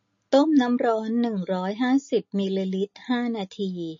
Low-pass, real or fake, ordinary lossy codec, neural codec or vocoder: 7.2 kHz; real; MP3, 32 kbps; none